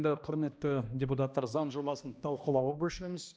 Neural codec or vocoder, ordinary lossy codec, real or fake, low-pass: codec, 16 kHz, 1 kbps, X-Codec, HuBERT features, trained on balanced general audio; none; fake; none